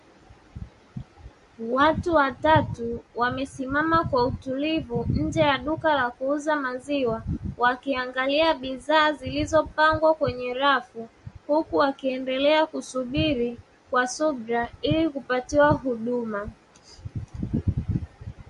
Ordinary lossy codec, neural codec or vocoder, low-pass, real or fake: MP3, 48 kbps; none; 14.4 kHz; real